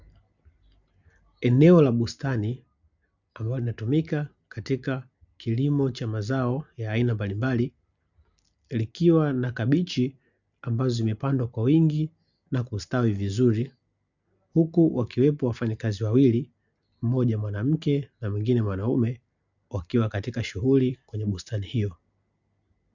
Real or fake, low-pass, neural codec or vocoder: real; 7.2 kHz; none